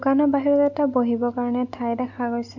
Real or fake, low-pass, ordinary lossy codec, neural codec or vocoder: real; 7.2 kHz; none; none